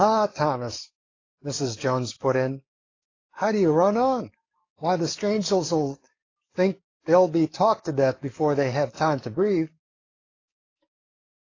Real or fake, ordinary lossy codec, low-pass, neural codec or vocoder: fake; AAC, 32 kbps; 7.2 kHz; codec, 44.1 kHz, 7.8 kbps, DAC